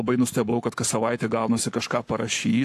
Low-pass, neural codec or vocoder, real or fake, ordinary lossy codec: 14.4 kHz; codec, 44.1 kHz, 7.8 kbps, Pupu-Codec; fake; AAC, 64 kbps